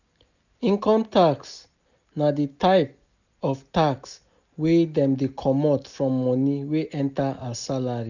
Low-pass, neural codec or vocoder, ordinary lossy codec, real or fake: 7.2 kHz; none; none; real